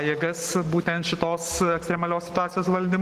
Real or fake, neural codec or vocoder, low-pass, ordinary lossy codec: real; none; 14.4 kHz; Opus, 16 kbps